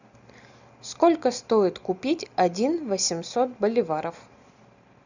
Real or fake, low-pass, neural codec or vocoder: real; 7.2 kHz; none